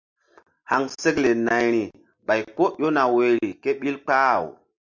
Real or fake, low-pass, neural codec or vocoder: real; 7.2 kHz; none